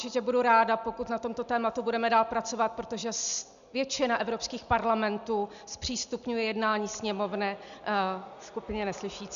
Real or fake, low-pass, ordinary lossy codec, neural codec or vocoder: real; 7.2 kHz; MP3, 96 kbps; none